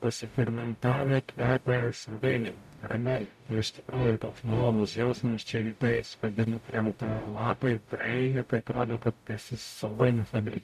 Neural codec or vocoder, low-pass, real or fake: codec, 44.1 kHz, 0.9 kbps, DAC; 14.4 kHz; fake